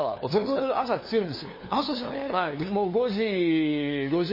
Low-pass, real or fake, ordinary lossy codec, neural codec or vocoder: 5.4 kHz; fake; MP3, 24 kbps; codec, 16 kHz, 2 kbps, FunCodec, trained on LibriTTS, 25 frames a second